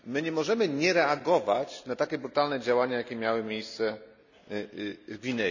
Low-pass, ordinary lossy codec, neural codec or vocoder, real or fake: 7.2 kHz; none; none; real